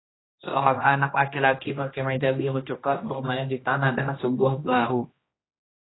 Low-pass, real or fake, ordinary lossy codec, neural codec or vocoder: 7.2 kHz; fake; AAC, 16 kbps; codec, 16 kHz, 1 kbps, X-Codec, HuBERT features, trained on general audio